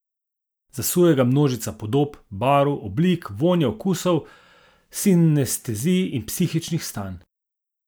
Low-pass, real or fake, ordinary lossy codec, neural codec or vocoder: none; real; none; none